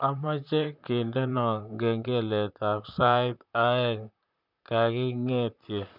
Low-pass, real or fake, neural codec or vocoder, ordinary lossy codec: 5.4 kHz; fake; vocoder, 44.1 kHz, 128 mel bands, Pupu-Vocoder; none